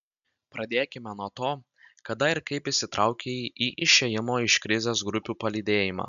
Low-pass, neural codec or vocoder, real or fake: 7.2 kHz; none; real